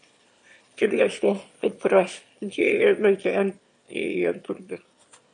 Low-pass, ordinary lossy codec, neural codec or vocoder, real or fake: 9.9 kHz; MP3, 48 kbps; autoencoder, 22.05 kHz, a latent of 192 numbers a frame, VITS, trained on one speaker; fake